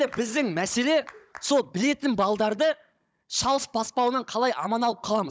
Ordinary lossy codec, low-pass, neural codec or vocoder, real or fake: none; none; codec, 16 kHz, 16 kbps, FunCodec, trained on Chinese and English, 50 frames a second; fake